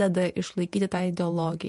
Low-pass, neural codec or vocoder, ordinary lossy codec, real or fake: 14.4 kHz; vocoder, 48 kHz, 128 mel bands, Vocos; MP3, 48 kbps; fake